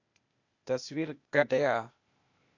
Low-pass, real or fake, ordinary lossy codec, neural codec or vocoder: 7.2 kHz; fake; AAC, 48 kbps; codec, 16 kHz, 0.8 kbps, ZipCodec